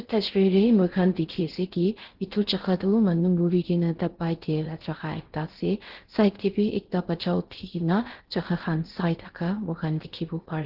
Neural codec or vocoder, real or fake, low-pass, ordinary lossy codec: codec, 16 kHz in and 24 kHz out, 0.6 kbps, FocalCodec, streaming, 4096 codes; fake; 5.4 kHz; Opus, 16 kbps